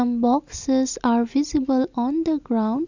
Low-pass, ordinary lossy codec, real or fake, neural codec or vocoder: 7.2 kHz; none; real; none